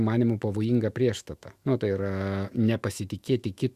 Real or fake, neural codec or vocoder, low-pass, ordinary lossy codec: fake; autoencoder, 48 kHz, 128 numbers a frame, DAC-VAE, trained on Japanese speech; 14.4 kHz; Opus, 64 kbps